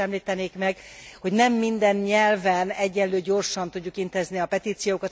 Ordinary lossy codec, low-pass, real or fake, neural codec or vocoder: none; none; real; none